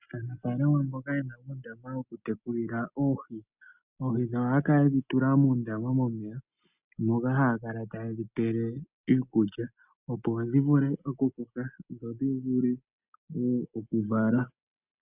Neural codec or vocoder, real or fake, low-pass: none; real; 3.6 kHz